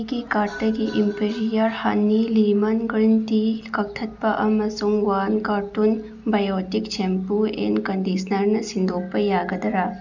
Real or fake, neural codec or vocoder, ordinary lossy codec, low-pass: real; none; none; 7.2 kHz